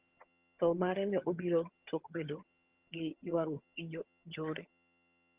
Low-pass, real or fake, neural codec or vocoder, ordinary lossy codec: 3.6 kHz; fake; vocoder, 22.05 kHz, 80 mel bands, HiFi-GAN; Opus, 32 kbps